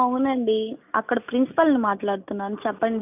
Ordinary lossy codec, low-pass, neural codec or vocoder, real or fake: none; 3.6 kHz; none; real